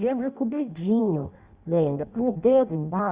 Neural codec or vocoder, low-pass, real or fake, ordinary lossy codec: codec, 16 kHz in and 24 kHz out, 0.6 kbps, FireRedTTS-2 codec; 3.6 kHz; fake; Opus, 64 kbps